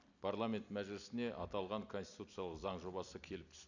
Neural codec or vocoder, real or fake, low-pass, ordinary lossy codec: none; real; 7.2 kHz; none